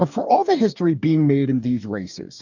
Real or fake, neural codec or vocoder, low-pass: fake; codec, 44.1 kHz, 2.6 kbps, DAC; 7.2 kHz